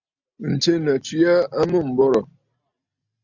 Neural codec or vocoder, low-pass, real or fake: none; 7.2 kHz; real